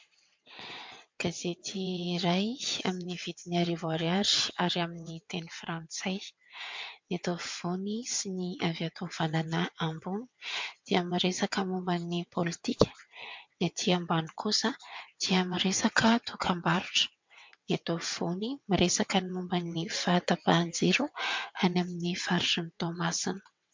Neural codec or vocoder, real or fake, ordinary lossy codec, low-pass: vocoder, 22.05 kHz, 80 mel bands, WaveNeXt; fake; MP3, 64 kbps; 7.2 kHz